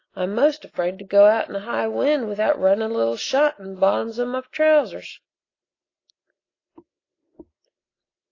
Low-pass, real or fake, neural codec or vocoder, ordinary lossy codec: 7.2 kHz; real; none; AAC, 32 kbps